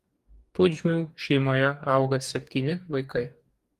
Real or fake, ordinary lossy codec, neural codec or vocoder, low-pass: fake; Opus, 32 kbps; codec, 44.1 kHz, 2.6 kbps, DAC; 14.4 kHz